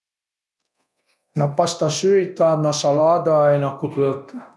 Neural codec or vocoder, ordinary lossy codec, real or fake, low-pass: codec, 24 kHz, 0.9 kbps, DualCodec; none; fake; none